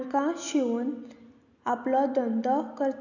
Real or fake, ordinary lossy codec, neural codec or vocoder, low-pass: real; none; none; 7.2 kHz